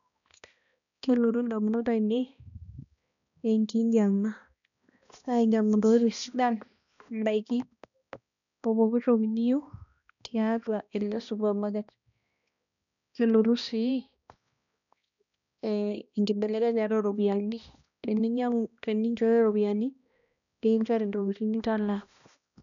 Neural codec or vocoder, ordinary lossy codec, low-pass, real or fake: codec, 16 kHz, 1 kbps, X-Codec, HuBERT features, trained on balanced general audio; none; 7.2 kHz; fake